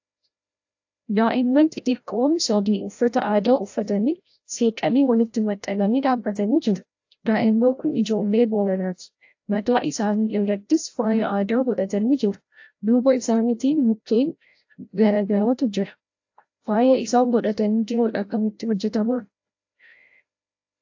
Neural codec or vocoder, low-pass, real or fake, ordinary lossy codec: codec, 16 kHz, 0.5 kbps, FreqCodec, larger model; 7.2 kHz; fake; AAC, 48 kbps